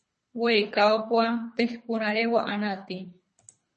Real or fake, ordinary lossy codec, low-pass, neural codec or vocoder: fake; MP3, 32 kbps; 10.8 kHz; codec, 24 kHz, 3 kbps, HILCodec